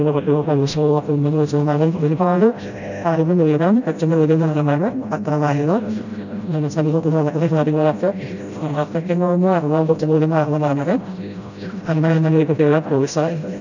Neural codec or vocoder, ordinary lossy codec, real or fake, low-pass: codec, 16 kHz, 0.5 kbps, FreqCodec, smaller model; none; fake; 7.2 kHz